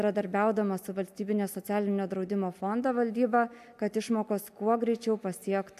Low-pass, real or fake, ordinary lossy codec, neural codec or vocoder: 14.4 kHz; real; AAC, 96 kbps; none